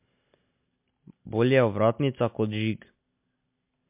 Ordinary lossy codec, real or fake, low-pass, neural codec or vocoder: MP3, 32 kbps; real; 3.6 kHz; none